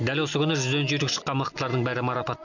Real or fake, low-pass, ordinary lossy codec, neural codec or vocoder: real; 7.2 kHz; none; none